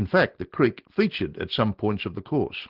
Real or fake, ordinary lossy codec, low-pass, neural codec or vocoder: real; Opus, 16 kbps; 5.4 kHz; none